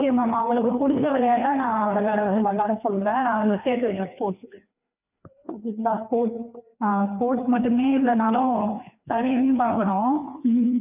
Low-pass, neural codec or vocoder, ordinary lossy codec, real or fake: 3.6 kHz; codec, 16 kHz, 2 kbps, FreqCodec, larger model; none; fake